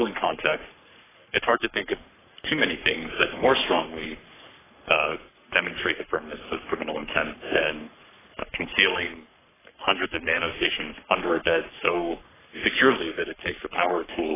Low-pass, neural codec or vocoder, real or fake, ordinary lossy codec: 3.6 kHz; codec, 44.1 kHz, 3.4 kbps, Pupu-Codec; fake; AAC, 16 kbps